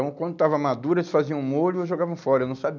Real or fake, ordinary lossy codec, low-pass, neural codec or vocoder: real; none; 7.2 kHz; none